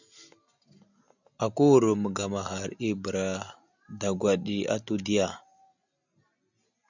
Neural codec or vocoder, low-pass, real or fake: none; 7.2 kHz; real